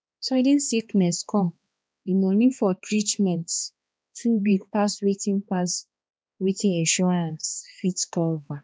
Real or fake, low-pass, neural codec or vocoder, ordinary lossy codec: fake; none; codec, 16 kHz, 2 kbps, X-Codec, HuBERT features, trained on balanced general audio; none